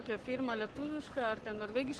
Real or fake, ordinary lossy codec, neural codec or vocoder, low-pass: fake; Opus, 16 kbps; codec, 44.1 kHz, 7.8 kbps, Pupu-Codec; 10.8 kHz